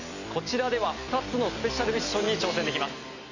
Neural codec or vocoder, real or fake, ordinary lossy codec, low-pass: none; real; none; 7.2 kHz